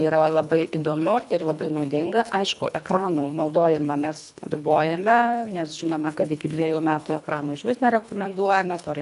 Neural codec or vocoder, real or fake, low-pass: codec, 24 kHz, 1.5 kbps, HILCodec; fake; 10.8 kHz